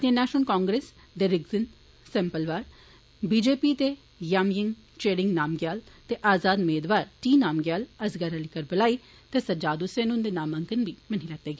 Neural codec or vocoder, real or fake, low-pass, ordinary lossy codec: none; real; none; none